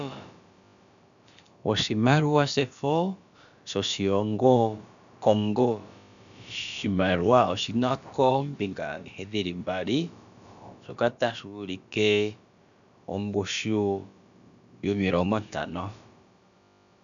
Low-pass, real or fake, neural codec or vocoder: 7.2 kHz; fake; codec, 16 kHz, about 1 kbps, DyCAST, with the encoder's durations